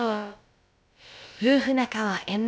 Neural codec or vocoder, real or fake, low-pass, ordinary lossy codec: codec, 16 kHz, about 1 kbps, DyCAST, with the encoder's durations; fake; none; none